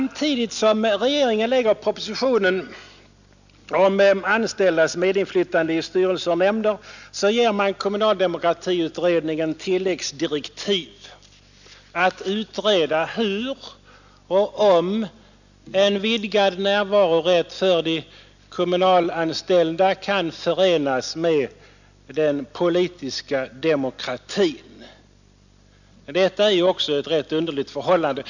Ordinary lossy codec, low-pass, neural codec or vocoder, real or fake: none; 7.2 kHz; none; real